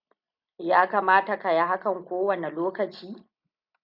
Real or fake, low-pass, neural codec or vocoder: real; 5.4 kHz; none